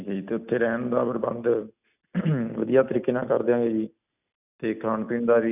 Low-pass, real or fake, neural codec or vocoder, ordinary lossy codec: 3.6 kHz; real; none; none